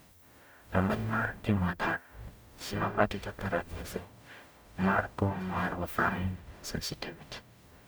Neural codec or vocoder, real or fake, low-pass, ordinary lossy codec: codec, 44.1 kHz, 0.9 kbps, DAC; fake; none; none